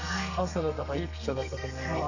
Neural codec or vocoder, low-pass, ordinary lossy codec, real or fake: codec, 32 kHz, 1.9 kbps, SNAC; 7.2 kHz; none; fake